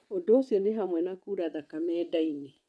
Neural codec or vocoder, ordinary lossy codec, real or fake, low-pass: vocoder, 22.05 kHz, 80 mel bands, WaveNeXt; none; fake; none